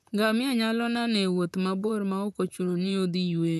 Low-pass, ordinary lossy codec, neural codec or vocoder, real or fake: 14.4 kHz; none; vocoder, 44.1 kHz, 128 mel bands, Pupu-Vocoder; fake